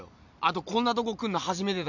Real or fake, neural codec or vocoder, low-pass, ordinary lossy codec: real; none; 7.2 kHz; none